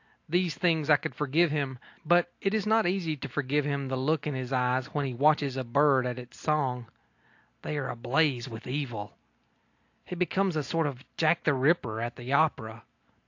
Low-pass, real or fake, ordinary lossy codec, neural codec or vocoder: 7.2 kHz; real; AAC, 48 kbps; none